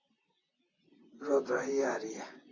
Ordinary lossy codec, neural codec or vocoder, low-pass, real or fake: AAC, 32 kbps; vocoder, 44.1 kHz, 128 mel bands, Pupu-Vocoder; 7.2 kHz; fake